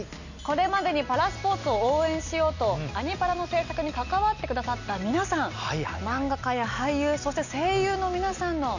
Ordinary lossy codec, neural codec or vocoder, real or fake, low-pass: none; none; real; 7.2 kHz